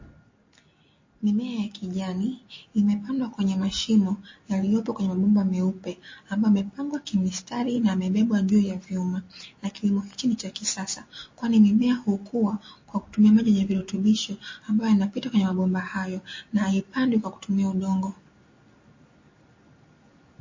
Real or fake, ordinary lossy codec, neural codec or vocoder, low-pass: real; MP3, 32 kbps; none; 7.2 kHz